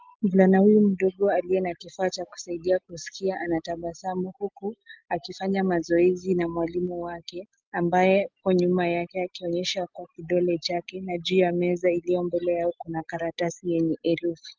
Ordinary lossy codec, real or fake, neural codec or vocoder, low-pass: Opus, 32 kbps; real; none; 7.2 kHz